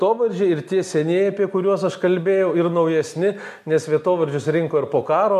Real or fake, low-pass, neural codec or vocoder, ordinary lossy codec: real; 14.4 kHz; none; MP3, 64 kbps